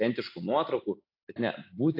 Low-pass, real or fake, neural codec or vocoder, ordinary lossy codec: 5.4 kHz; real; none; AAC, 32 kbps